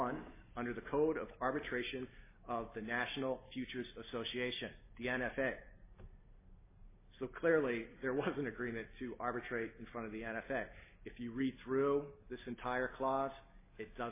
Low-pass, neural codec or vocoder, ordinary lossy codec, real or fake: 3.6 kHz; none; MP3, 16 kbps; real